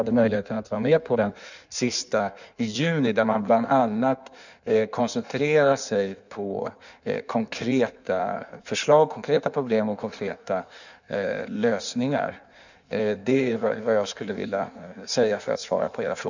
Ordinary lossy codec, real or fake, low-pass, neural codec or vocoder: none; fake; 7.2 kHz; codec, 16 kHz in and 24 kHz out, 1.1 kbps, FireRedTTS-2 codec